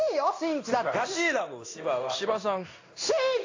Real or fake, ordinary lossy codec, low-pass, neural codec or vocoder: fake; AAC, 48 kbps; 7.2 kHz; codec, 16 kHz in and 24 kHz out, 1 kbps, XY-Tokenizer